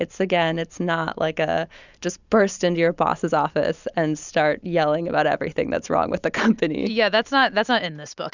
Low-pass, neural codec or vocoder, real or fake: 7.2 kHz; none; real